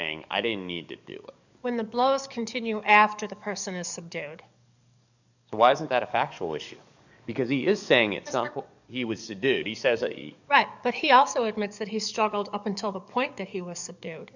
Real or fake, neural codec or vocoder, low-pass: fake; codec, 44.1 kHz, 7.8 kbps, DAC; 7.2 kHz